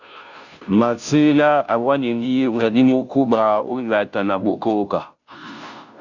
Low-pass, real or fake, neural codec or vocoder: 7.2 kHz; fake; codec, 16 kHz, 0.5 kbps, FunCodec, trained on Chinese and English, 25 frames a second